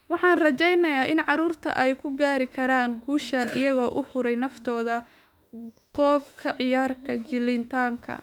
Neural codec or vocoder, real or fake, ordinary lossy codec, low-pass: autoencoder, 48 kHz, 32 numbers a frame, DAC-VAE, trained on Japanese speech; fake; none; 19.8 kHz